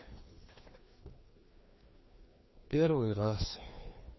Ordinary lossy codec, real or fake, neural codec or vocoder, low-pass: MP3, 24 kbps; fake; codec, 16 kHz, 2 kbps, FreqCodec, larger model; 7.2 kHz